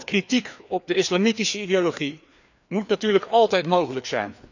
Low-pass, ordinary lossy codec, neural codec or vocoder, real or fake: 7.2 kHz; none; codec, 16 kHz, 2 kbps, FreqCodec, larger model; fake